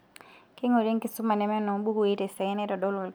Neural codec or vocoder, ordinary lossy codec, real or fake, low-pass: none; none; real; none